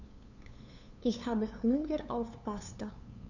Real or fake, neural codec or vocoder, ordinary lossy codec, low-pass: fake; codec, 16 kHz, 2 kbps, FunCodec, trained on LibriTTS, 25 frames a second; none; 7.2 kHz